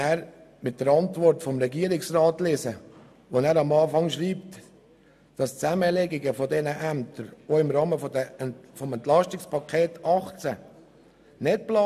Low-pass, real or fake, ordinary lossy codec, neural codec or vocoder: 14.4 kHz; real; AAC, 96 kbps; none